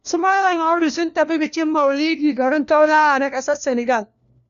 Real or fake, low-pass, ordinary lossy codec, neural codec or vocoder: fake; 7.2 kHz; none; codec, 16 kHz, 1 kbps, FunCodec, trained on LibriTTS, 50 frames a second